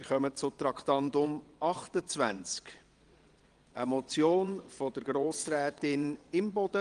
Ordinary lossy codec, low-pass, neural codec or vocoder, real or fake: Opus, 24 kbps; 9.9 kHz; vocoder, 22.05 kHz, 80 mel bands, WaveNeXt; fake